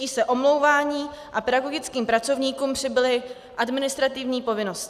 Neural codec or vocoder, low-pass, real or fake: none; 14.4 kHz; real